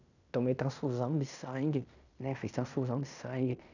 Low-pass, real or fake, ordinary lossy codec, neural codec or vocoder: 7.2 kHz; fake; none; codec, 16 kHz in and 24 kHz out, 0.9 kbps, LongCat-Audio-Codec, fine tuned four codebook decoder